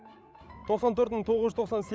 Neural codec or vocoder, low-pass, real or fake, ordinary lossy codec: codec, 16 kHz, 16 kbps, FreqCodec, larger model; none; fake; none